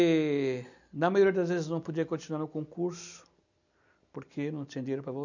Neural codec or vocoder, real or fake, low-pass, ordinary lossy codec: none; real; 7.2 kHz; none